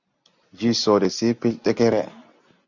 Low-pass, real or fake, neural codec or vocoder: 7.2 kHz; real; none